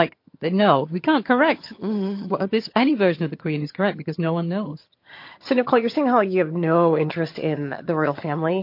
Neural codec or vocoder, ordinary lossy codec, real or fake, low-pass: vocoder, 22.05 kHz, 80 mel bands, HiFi-GAN; MP3, 32 kbps; fake; 5.4 kHz